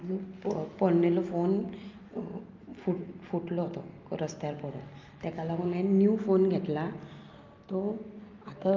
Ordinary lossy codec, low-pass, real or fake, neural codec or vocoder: Opus, 24 kbps; 7.2 kHz; real; none